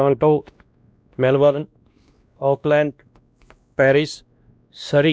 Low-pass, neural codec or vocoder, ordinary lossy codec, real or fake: none; codec, 16 kHz, 1 kbps, X-Codec, WavLM features, trained on Multilingual LibriSpeech; none; fake